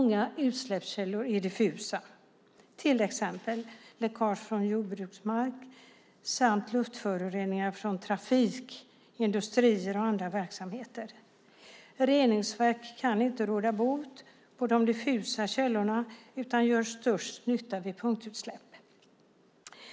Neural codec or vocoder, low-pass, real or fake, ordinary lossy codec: none; none; real; none